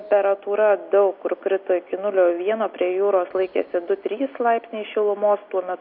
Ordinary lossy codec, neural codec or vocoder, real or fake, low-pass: MP3, 48 kbps; none; real; 5.4 kHz